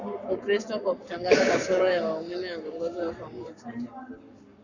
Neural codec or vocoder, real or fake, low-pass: codec, 44.1 kHz, 7.8 kbps, Pupu-Codec; fake; 7.2 kHz